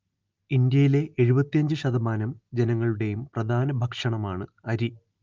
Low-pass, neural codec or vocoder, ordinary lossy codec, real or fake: 7.2 kHz; none; Opus, 24 kbps; real